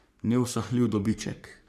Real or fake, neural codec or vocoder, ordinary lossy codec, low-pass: fake; codec, 44.1 kHz, 3.4 kbps, Pupu-Codec; none; 14.4 kHz